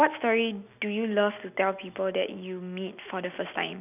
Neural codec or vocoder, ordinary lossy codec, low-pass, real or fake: none; none; 3.6 kHz; real